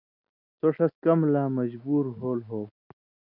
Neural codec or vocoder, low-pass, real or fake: none; 5.4 kHz; real